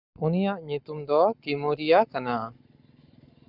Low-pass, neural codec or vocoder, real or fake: 5.4 kHz; codec, 44.1 kHz, 7.8 kbps, Pupu-Codec; fake